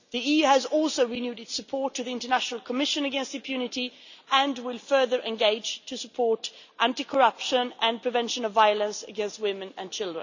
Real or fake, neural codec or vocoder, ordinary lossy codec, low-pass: real; none; none; 7.2 kHz